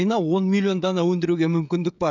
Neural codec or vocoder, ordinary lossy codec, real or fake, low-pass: codec, 16 kHz, 16 kbps, FreqCodec, smaller model; none; fake; 7.2 kHz